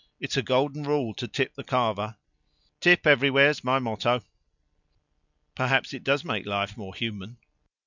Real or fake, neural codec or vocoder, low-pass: real; none; 7.2 kHz